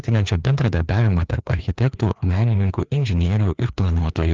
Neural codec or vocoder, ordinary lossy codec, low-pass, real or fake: codec, 16 kHz, 2 kbps, FreqCodec, larger model; Opus, 24 kbps; 7.2 kHz; fake